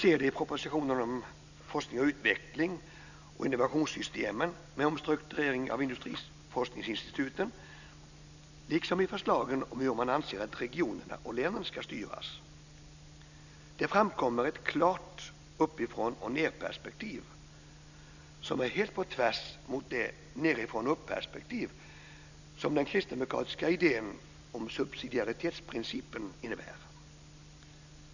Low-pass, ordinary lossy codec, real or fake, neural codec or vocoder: 7.2 kHz; none; real; none